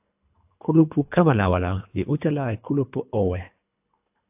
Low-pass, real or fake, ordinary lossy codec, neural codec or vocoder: 3.6 kHz; fake; AAC, 32 kbps; codec, 24 kHz, 3 kbps, HILCodec